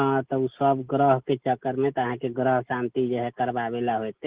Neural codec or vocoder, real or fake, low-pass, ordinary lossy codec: none; real; 3.6 kHz; Opus, 16 kbps